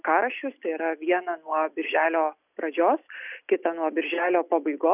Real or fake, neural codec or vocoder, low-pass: real; none; 3.6 kHz